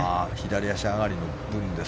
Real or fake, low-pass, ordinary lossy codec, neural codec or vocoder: real; none; none; none